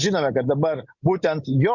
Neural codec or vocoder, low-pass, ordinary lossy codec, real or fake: none; 7.2 kHz; Opus, 64 kbps; real